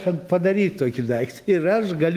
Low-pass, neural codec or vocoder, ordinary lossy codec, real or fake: 14.4 kHz; autoencoder, 48 kHz, 128 numbers a frame, DAC-VAE, trained on Japanese speech; Opus, 24 kbps; fake